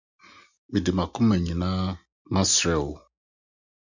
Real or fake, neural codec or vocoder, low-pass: real; none; 7.2 kHz